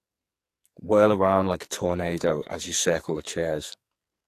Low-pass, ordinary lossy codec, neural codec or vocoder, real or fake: 14.4 kHz; AAC, 64 kbps; codec, 44.1 kHz, 2.6 kbps, SNAC; fake